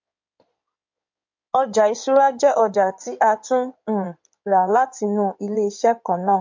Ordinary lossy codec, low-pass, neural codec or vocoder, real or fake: MP3, 48 kbps; 7.2 kHz; codec, 16 kHz in and 24 kHz out, 2.2 kbps, FireRedTTS-2 codec; fake